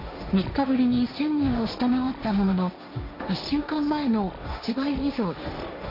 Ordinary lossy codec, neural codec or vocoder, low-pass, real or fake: none; codec, 16 kHz, 1.1 kbps, Voila-Tokenizer; 5.4 kHz; fake